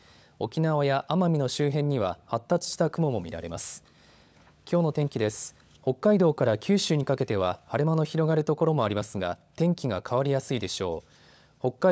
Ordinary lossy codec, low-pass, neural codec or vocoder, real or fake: none; none; codec, 16 kHz, 16 kbps, FunCodec, trained on LibriTTS, 50 frames a second; fake